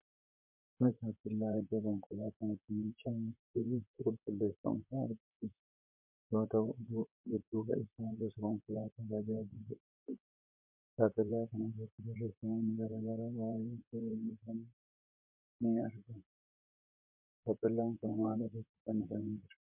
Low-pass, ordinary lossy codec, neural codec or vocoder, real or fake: 3.6 kHz; MP3, 32 kbps; vocoder, 44.1 kHz, 128 mel bands, Pupu-Vocoder; fake